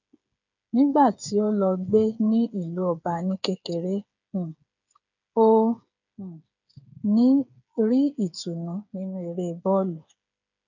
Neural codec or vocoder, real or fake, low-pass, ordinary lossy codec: codec, 16 kHz, 8 kbps, FreqCodec, smaller model; fake; 7.2 kHz; none